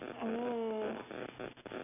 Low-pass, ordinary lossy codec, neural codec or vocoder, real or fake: 3.6 kHz; none; none; real